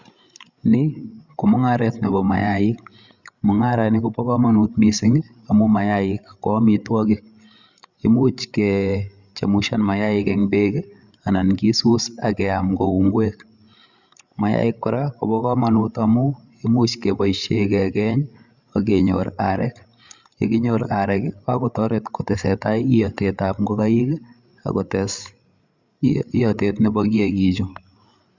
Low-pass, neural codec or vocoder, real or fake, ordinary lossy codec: 7.2 kHz; codec, 16 kHz, 8 kbps, FreqCodec, larger model; fake; Opus, 64 kbps